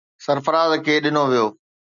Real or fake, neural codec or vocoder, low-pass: real; none; 7.2 kHz